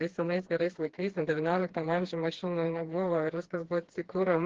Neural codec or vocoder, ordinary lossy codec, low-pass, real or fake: codec, 16 kHz, 2 kbps, FreqCodec, smaller model; Opus, 16 kbps; 7.2 kHz; fake